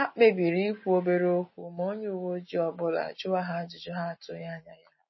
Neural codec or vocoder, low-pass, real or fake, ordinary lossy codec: none; 7.2 kHz; real; MP3, 24 kbps